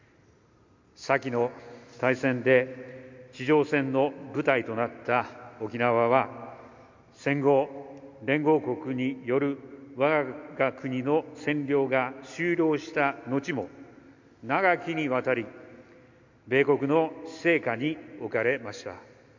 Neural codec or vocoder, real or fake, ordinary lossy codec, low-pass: none; real; MP3, 48 kbps; 7.2 kHz